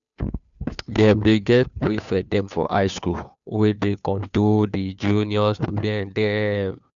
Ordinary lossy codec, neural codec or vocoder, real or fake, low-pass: none; codec, 16 kHz, 2 kbps, FunCodec, trained on Chinese and English, 25 frames a second; fake; 7.2 kHz